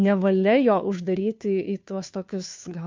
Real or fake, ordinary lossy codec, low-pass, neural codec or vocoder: fake; MP3, 64 kbps; 7.2 kHz; codec, 16 kHz, 2 kbps, FunCodec, trained on Chinese and English, 25 frames a second